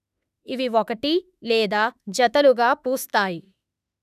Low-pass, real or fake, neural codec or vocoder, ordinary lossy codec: 14.4 kHz; fake; autoencoder, 48 kHz, 32 numbers a frame, DAC-VAE, trained on Japanese speech; none